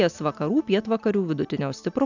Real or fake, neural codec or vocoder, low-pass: real; none; 7.2 kHz